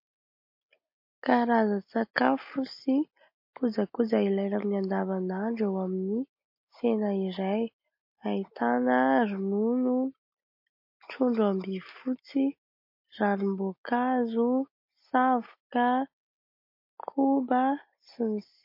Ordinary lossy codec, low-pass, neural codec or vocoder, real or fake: MP3, 32 kbps; 5.4 kHz; none; real